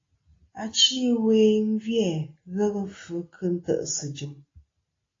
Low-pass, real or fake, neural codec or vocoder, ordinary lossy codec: 7.2 kHz; real; none; AAC, 32 kbps